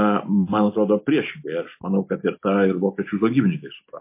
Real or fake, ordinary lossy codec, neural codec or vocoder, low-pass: real; MP3, 24 kbps; none; 3.6 kHz